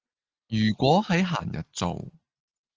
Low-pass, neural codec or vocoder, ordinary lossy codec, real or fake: 7.2 kHz; none; Opus, 24 kbps; real